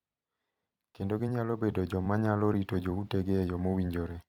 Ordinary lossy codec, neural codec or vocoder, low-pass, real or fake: none; vocoder, 44.1 kHz, 128 mel bands every 256 samples, BigVGAN v2; 19.8 kHz; fake